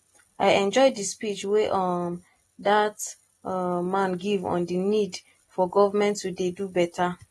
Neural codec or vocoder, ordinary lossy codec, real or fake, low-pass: none; AAC, 32 kbps; real; 19.8 kHz